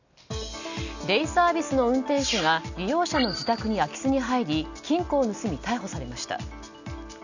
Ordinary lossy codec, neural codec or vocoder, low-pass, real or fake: none; none; 7.2 kHz; real